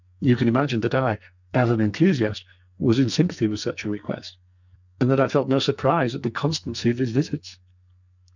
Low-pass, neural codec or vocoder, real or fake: 7.2 kHz; codec, 44.1 kHz, 2.6 kbps, SNAC; fake